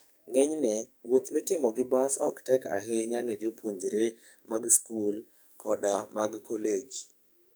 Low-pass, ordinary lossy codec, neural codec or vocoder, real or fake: none; none; codec, 44.1 kHz, 2.6 kbps, SNAC; fake